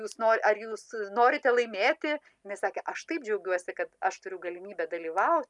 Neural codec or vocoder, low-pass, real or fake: none; 10.8 kHz; real